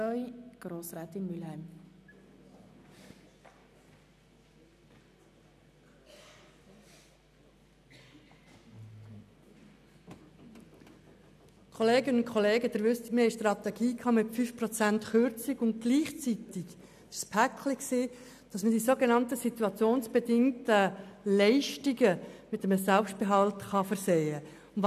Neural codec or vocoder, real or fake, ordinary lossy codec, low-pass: none; real; none; 14.4 kHz